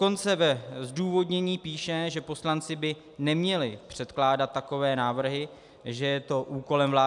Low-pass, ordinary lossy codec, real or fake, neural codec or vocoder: 10.8 kHz; MP3, 96 kbps; real; none